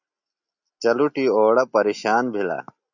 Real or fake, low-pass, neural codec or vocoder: real; 7.2 kHz; none